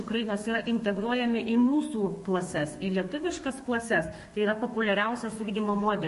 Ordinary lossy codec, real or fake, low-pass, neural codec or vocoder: MP3, 48 kbps; fake; 14.4 kHz; codec, 32 kHz, 1.9 kbps, SNAC